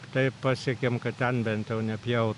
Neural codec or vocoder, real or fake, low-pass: none; real; 10.8 kHz